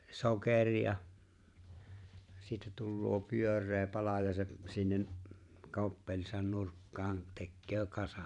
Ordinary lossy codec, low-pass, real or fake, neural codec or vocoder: none; 10.8 kHz; fake; vocoder, 44.1 kHz, 128 mel bands every 512 samples, BigVGAN v2